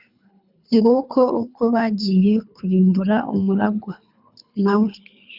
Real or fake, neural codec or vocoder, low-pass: fake; codec, 24 kHz, 3 kbps, HILCodec; 5.4 kHz